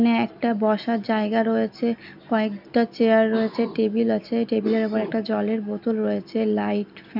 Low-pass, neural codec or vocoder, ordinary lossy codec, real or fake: 5.4 kHz; none; none; real